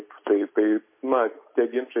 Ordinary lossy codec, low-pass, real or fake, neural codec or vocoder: MP3, 24 kbps; 3.6 kHz; real; none